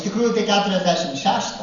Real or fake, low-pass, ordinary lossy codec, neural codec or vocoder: real; 7.2 kHz; AAC, 64 kbps; none